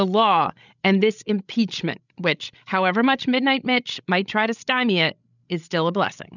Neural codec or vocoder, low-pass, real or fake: codec, 16 kHz, 16 kbps, FreqCodec, larger model; 7.2 kHz; fake